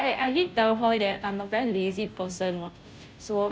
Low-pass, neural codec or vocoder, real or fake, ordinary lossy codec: none; codec, 16 kHz, 0.5 kbps, FunCodec, trained on Chinese and English, 25 frames a second; fake; none